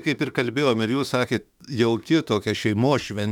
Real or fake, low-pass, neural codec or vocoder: fake; 19.8 kHz; autoencoder, 48 kHz, 32 numbers a frame, DAC-VAE, trained on Japanese speech